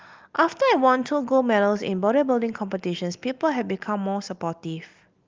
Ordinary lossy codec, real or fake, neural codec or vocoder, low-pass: Opus, 24 kbps; real; none; 7.2 kHz